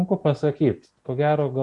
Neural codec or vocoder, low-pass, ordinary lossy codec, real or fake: none; 10.8 kHz; MP3, 48 kbps; real